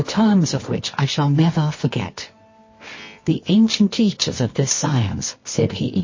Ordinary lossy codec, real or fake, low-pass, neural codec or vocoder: MP3, 32 kbps; fake; 7.2 kHz; codec, 24 kHz, 0.9 kbps, WavTokenizer, medium music audio release